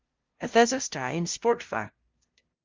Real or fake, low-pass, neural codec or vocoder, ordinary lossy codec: fake; 7.2 kHz; codec, 16 kHz, 0.5 kbps, FunCodec, trained on LibriTTS, 25 frames a second; Opus, 32 kbps